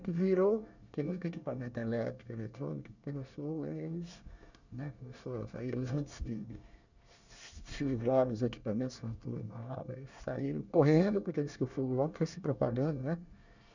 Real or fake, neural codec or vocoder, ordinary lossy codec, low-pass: fake; codec, 24 kHz, 1 kbps, SNAC; none; 7.2 kHz